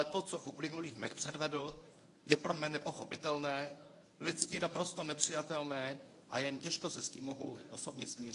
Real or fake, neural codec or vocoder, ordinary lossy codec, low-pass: fake; codec, 24 kHz, 0.9 kbps, WavTokenizer, medium speech release version 1; AAC, 48 kbps; 10.8 kHz